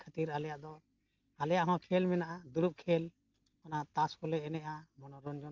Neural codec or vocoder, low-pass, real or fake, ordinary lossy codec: none; 7.2 kHz; real; Opus, 32 kbps